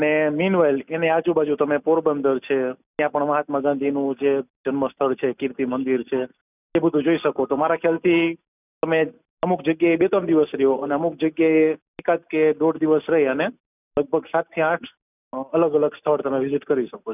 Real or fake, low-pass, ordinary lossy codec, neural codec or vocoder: real; 3.6 kHz; none; none